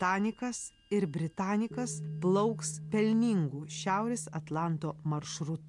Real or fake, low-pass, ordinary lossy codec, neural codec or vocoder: real; 10.8 kHz; MP3, 64 kbps; none